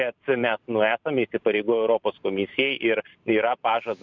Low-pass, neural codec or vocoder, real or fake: 7.2 kHz; vocoder, 44.1 kHz, 128 mel bands every 512 samples, BigVGAN v2; fake